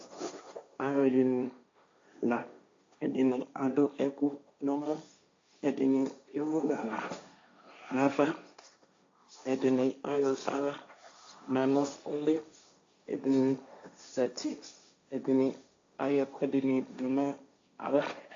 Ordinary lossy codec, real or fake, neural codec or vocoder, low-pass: AAC, 48 kbps; fake; codec, 16 kHz, 1.1 kbps, Voila-Tokenizer; 7.2 kHz